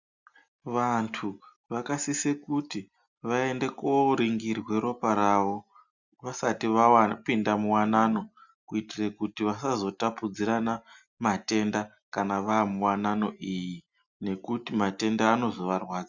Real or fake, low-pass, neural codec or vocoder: real; 7.2 kHz; none